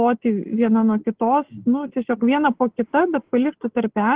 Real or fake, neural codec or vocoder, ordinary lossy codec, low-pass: real; none; Opus, 16 kbps; 3.6 kHz